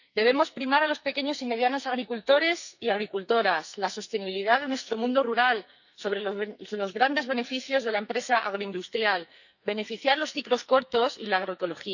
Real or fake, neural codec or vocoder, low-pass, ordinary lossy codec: fake; codec, 44.1 kHz, 2.6 kbps, SNAC; 7.2 kHz; none